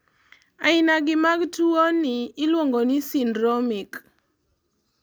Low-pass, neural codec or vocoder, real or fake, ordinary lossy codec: none; none; real; none